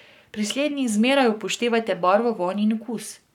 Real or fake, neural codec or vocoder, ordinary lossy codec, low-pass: fake; codec, 44.1 kHz, 7.8 kbps, Pupu-Codec; none; 19.8 kHz